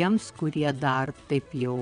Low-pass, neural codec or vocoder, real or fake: 9.9 kHz; vocoder, 22.05 kHz, 80 mel bands, WaveNeXt; fake